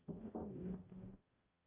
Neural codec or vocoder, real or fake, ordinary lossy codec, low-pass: codec, 44.1 kHz, 0.9 kbps, DAC; fake; Opus, 32 kbps; 3.6 kHz